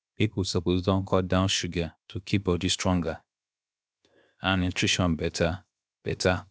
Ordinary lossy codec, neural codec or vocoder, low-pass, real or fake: none; codec, 16 kHz, 0.7 kbps, FocalCodec; none; fake